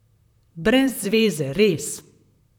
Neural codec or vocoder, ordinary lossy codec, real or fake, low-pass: vocoder, 44.1 kHz, 128 mel bands, Pupu-Vocoder; none; fake; 19.8 kHz